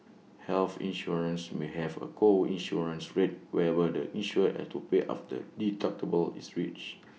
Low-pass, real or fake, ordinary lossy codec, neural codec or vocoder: none; real; none; none